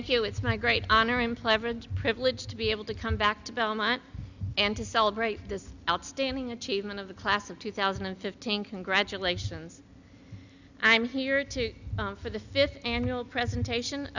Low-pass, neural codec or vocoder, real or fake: 7.2 kHz; none; real